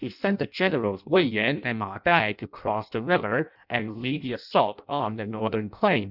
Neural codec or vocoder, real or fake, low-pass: codec, 16 kHz in and 24 kHz out, 0.6 kbps, FireRedTTS-2 codec; fake; 5.4 kHz